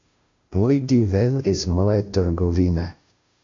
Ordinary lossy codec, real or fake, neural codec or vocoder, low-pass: AAC, 64 kbps; fake; codec, 16 kHz, 0.5 kbps, FunCodec, trained on Chinese and English, 25 frames a second; 7.2 kHz